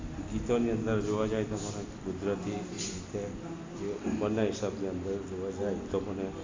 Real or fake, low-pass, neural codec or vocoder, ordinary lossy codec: real; 7.2 kHz; none; AAC, 32 kbps